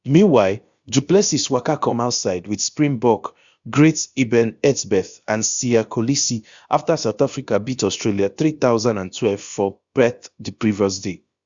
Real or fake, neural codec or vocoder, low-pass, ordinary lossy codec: fake; codec, 16 kHz, about 1 kbps, DyCAST, with the encoder's durations; 7.2 kHz; Opus, 64 kbps